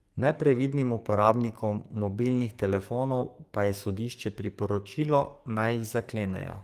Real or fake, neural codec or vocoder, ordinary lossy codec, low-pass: fake; codec, 44.1 kHz, 2.6 kbps, SNAC; Opus, 32 kbps; 14.4 kHz